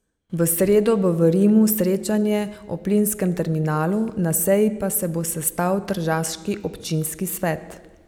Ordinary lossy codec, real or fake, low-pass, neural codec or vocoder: none; real; none; none